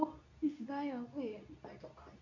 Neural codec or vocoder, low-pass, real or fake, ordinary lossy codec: codec, 24 kHz, 0.9 kbps, WavTokenizer, medium speech release version 2; 7.2 kHz; fake; none